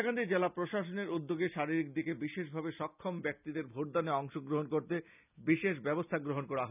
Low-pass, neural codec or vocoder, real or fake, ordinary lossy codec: 3.6 kHz; none; real; none